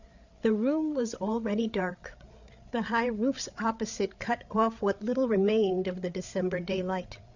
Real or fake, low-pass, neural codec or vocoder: fake; 7.2 kHz; codec, 16 kHz, 8 kbps, FreqCodec, larger model